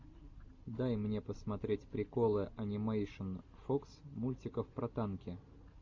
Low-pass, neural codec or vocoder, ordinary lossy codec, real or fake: 7.2 kHz; none; MP3, 48 kbps; real